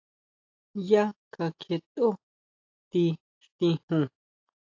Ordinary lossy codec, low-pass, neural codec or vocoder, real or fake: AAC, 48 kbps; 7.2 kHz; none; real